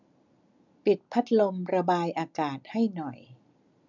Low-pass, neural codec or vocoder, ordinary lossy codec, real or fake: 7.2 kHz; none; none; real